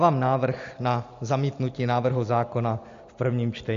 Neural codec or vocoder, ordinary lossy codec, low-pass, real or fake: none; AAC, 48 kbps; 7.2 kHz; real